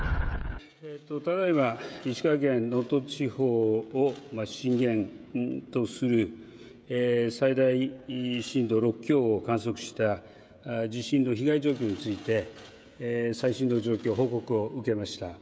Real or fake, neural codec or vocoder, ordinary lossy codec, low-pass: fake; codec, 16 kHz, 16 kbps, FreqCodec, smaller model; none; none